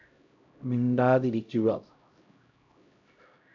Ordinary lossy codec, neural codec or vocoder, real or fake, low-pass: AAC, 48 kbps; codec, 16 kHz, 0.5 kbps, X-Codec, HuBERT features, trained on LibriSpeech; fake; 7.2 kHz